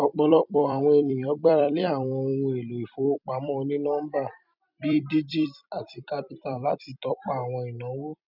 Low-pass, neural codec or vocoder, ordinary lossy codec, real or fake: 5.4 kHz; vocoder, 44.1 kHz, 128 mel bands every 256 samples, BigVGAN v2; none; fake